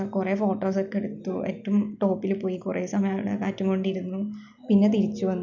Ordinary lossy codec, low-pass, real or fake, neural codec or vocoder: none; 7.2 kHz; real; none